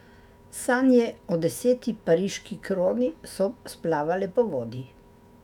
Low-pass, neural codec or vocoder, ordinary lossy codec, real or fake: 19.8 kHz; autoencoder, 48 kHz, 128 numbers a frame, DAC-VAE, trained on Japanese speech; none; fake